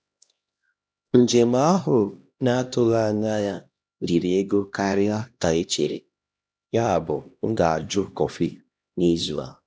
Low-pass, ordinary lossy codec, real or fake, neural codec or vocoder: none; none; fake; codec, 16 kHz, 1 kbps, X-Codec, HuBERT features, trained on LibriSpeech